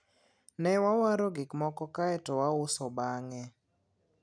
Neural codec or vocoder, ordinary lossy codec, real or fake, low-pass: none; none; real; 9.9 kHz